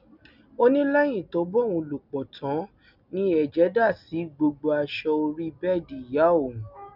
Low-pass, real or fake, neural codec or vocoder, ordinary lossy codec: 5.4 kHz; real; none; none